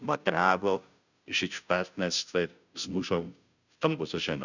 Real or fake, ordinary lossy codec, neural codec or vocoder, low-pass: fake; none; codec, 16 kHz, 0.5 kbps, FunCodec, trained on Chinese and English, 25 frames a second; 7.2 kHz